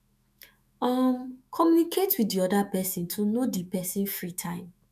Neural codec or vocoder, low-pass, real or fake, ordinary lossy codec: autoencoder, 48 kHz, 128 numbers a frame, DAC-VAE, trained on Japanese speech; 14.4 kHz; fake; none